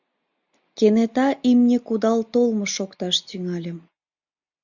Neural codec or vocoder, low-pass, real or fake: none; 7.2 kHz; real